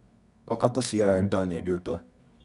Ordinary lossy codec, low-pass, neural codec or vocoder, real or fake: none; 10.8 kHz; codec, 24 kHz, 0.9 kbps, WavTokenizer, medium music audio release; fake